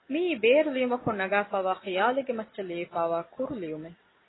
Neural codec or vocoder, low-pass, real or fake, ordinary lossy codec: none; 7.2 kHz; real; AAC, 16 kbps